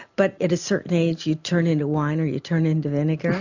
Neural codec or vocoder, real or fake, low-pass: none; real; 7.2 kHz